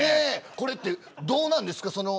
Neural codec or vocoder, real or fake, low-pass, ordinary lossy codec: none; real; none; none